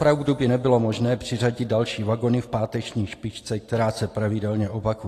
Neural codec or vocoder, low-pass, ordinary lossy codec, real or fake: none; 14.4 kHz; AAC, 48 kbps; real